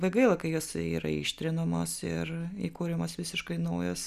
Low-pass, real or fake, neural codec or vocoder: 14.4 kHz; real; none